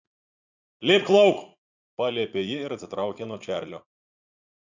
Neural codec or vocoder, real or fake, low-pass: vocoder, 44.1 kHz, 80 mel bands, Vocos; fake; 7.2 kHz